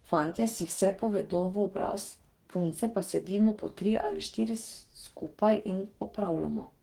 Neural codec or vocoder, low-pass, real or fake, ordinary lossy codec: codec, 44.1 kHz, 2.6 kbps, DAC; 19.8 kHz; fake; Opus, 24 kbps